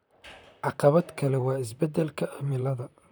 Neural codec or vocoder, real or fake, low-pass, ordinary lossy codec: vocoder, 44.1 kHz, 128 mel bands, Pupu-Vocoder; fake; none; none